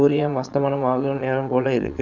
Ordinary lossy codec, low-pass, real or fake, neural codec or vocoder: none; 7.2 kHz; fake; vocoder, 22.05 kHz, 80 mel bands, Vocos